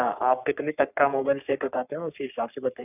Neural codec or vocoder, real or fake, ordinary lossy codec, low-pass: codec, 44.1 kHz, 3.4 kbps, Pupu-Codec; fake; none; 3.6 kHz